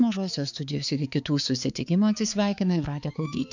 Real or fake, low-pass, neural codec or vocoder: fake; 7.2 kHz; codec, 16 kHz, 4 kbps, X-Codec, HuBERT features, trained on balanced general audio